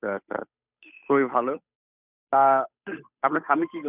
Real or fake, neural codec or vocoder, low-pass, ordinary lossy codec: fake; codec, 16 kHz, 8 kbps, FunCodec, trained on Chinese and English, 25 frames a second; 3.6 kHz; none